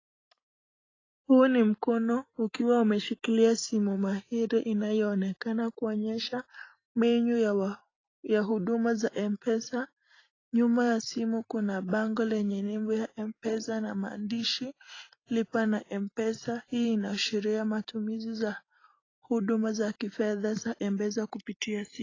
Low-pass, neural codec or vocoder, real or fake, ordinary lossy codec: 7.2 kHz; none; real; AAC, 32 kbps